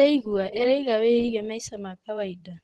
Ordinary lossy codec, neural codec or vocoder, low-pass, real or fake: Opus, 16 kbps; vocoder, 24 kHz, 100 mel bands, Vocos; 10.8 kHz; fake